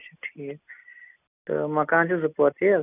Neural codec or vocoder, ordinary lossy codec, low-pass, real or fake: none; none; 3.6 kHz; real